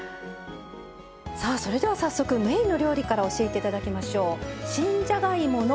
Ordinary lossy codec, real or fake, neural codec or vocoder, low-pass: none; real; none; none